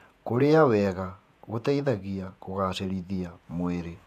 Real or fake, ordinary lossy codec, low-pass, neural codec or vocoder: fake; MP3, 96 kbps; 14.4 kHz; vocoder, 48 kHz, 128 mel bands, Vocos